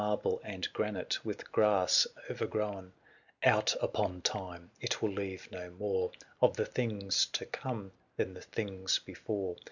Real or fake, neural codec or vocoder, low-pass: real; none; 7.2 kHz